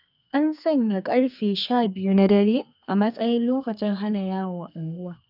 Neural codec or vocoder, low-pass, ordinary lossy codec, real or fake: codec, 32 kHz, 1.9 kbps, SNAC; 5.4 kHz; none; fake